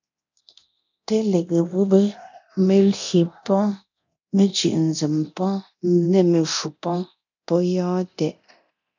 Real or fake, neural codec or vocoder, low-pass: fake; codec, 24 kHz, 0.9 kbps, DualCodec; 7.2 kHz